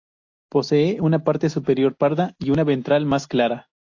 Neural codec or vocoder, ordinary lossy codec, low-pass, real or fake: none; AAC, 48 kbps; 7.2 kHz; real